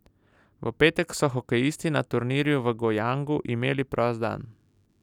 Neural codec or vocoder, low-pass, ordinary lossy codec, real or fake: none; 19.8 kHz; none; real